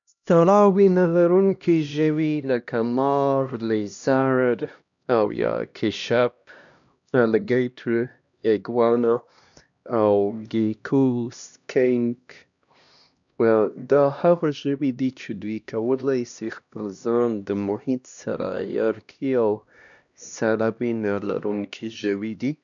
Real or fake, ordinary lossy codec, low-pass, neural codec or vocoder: fake; none; 7.2 kHz; codec, 16 kHz, 1 kbps, X-Codec, HuBERT features, trained on LibriSpeech